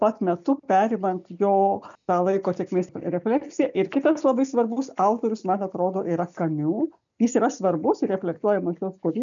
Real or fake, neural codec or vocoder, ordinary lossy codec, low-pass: real; none; MP3, 96 kbps; 7.2 kHz